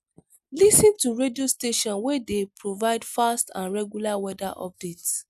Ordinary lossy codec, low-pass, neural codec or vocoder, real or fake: none; 14.4 kHz; none; real